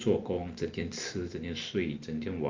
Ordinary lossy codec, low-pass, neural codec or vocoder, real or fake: Opus, 24 kbps; 7.2 kHz; none; real